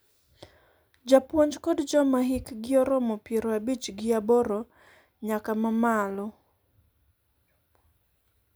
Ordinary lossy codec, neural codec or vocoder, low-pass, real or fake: none; none; none; real